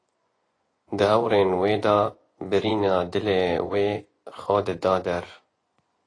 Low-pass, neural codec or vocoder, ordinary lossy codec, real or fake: 9.9 kHz; vocoder, 24 kHz, 100 mel bands, Vocos; AAC, 32 kbps; fake